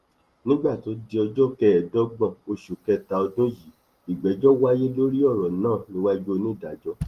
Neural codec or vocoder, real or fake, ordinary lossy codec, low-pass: none; real; Opus, 24 kbps; 14.4 kHz